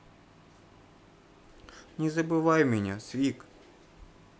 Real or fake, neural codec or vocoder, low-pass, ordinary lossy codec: real; none; none; none